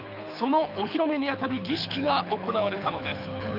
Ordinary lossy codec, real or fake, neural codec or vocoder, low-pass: none; fake; codec, 24 kHz, 6 kbps, HILCodec; 5.4 kHz